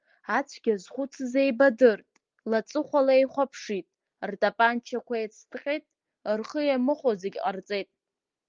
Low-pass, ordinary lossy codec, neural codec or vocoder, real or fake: 7.2 kHz; Opus, 32 kbps; none; real